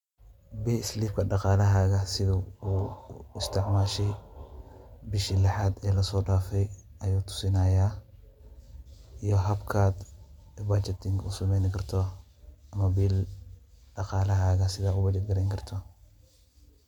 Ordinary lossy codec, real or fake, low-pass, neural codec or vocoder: MP3, 96 kbps; real; 19.8 kHz; none